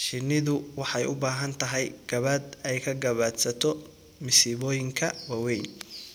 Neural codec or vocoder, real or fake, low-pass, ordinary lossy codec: none; real; none; none